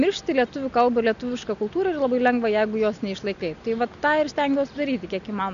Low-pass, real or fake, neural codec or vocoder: 7.2 kHz; real; none